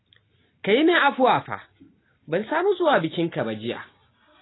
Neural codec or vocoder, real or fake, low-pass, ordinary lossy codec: none; real; 7.2 kHz; AAC, 16 kbps